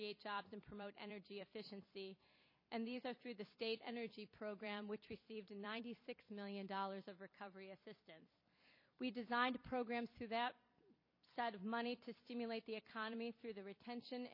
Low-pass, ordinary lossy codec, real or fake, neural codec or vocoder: 5.4 kHz; MP3, 24 kbps; fake; vocoder, 44.1 kHz, 128 mel bands every 256 samples, BigVGAN v2